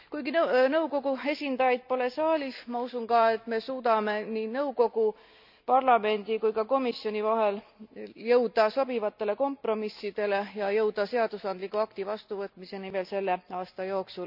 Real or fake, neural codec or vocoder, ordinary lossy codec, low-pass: real; none; none; 5.4 kHz